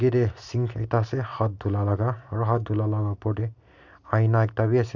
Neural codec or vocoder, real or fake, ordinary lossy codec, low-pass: none; real; none; 7.2 kHz